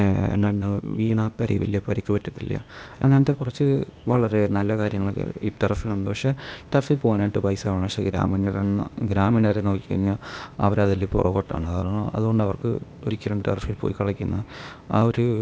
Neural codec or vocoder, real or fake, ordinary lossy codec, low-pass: codec, 16 kHz, 0.8 kbps, ZipCodec; fake; none; none